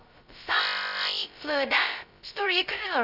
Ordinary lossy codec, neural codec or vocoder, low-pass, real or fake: none; codec, 16 kHz, 0.2 kbps, FocalCodec; 5.4 kHz; fake